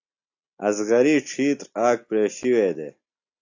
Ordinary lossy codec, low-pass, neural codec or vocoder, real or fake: AAC, 48 kbps; 7.2 kHz; none; real